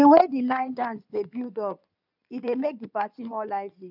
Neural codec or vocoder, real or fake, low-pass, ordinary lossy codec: codec, 16 kHz in and 24 kHz out, 2.2 kbps, FireRedTTS-2 codec; fake; 5.4 kHz; none